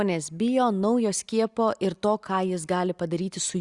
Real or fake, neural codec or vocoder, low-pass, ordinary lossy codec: real; none; 10.8 kHz; Opus, 64 kbps